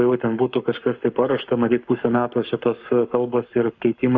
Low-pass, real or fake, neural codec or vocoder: 7.2 kHz; fake; codec, 44.1 kHz, 7.8 kbps, Pupu-Codec